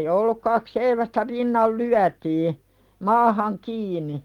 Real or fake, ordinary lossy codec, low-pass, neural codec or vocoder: real; Opus, 24 kbps; 19.8 kHz; none